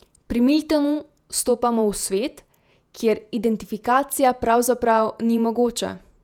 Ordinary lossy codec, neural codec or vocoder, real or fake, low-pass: none; vocoder, 48 kHz, 128 mel bands, Vocos; fake; 19.8 kHz